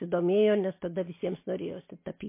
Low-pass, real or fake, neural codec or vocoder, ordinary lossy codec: 3.6 kHz; real; none; MP3, 24 kbps